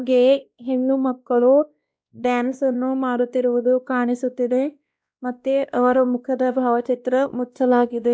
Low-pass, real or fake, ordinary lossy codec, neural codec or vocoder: none; fake; none; codec, 16 kHz, 1 kbps, X-Codec, WavLM features, trained on Multilingual LibriSpeech